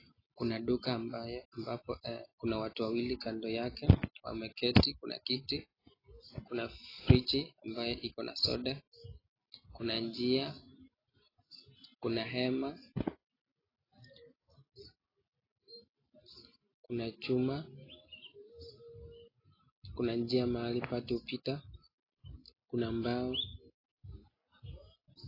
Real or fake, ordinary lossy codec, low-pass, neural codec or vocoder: real; AAC, 24 kbps; 5.4 kHz; none